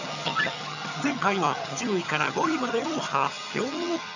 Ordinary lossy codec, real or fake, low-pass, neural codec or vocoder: none; fake; 7.2 kHz; vocoder, 22.05 kHz, 80 mel bands, HiFi-GAN